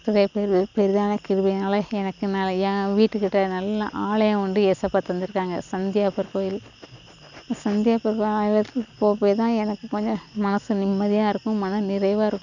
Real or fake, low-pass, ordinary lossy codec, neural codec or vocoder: real; 7.2 kHz; none; none